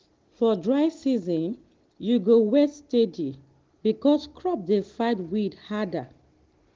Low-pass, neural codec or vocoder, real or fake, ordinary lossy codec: 7.2 kHz; none; real; Opus, 16 kbps